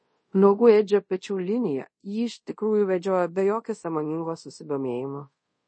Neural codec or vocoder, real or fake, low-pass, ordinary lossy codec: codec, 24 kHz, 0.5 kbps, DualCodec; fake; 9.9 kHz; MP3, 32 kbps